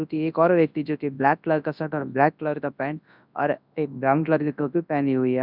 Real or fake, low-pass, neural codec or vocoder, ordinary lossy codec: fake; 5.4 kHz; codec, 24 kHz, 0.9 kbps, WavTokenizer, large speech release; none